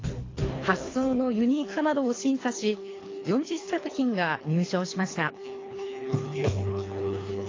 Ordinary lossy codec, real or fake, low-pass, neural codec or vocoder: AAC, 32 kbps; fake; 7.2 kHz; codec, 24 kHz, 3 kbps, HILCodec